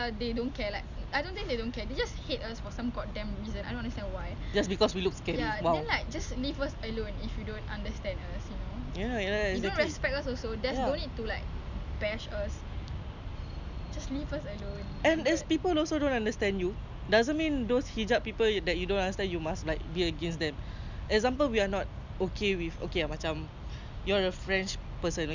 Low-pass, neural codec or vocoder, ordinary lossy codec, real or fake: 7.2 kHz; none; none; real